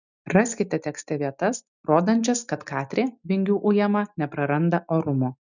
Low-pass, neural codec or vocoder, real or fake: 7.2 kHz; none; real